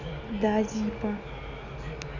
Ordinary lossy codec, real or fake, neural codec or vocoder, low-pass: none; real; none; 7.2 kHz